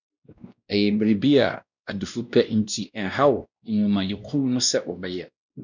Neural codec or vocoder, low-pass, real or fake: codec, 16 kHz, 1 kbps, X-Codec, WavLM features, trained on Multilingual LibriSpeech; 7.2 kHz; fake